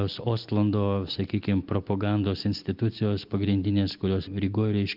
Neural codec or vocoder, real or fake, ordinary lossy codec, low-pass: none; real; Opus, 24 kbps; 5.4 kHz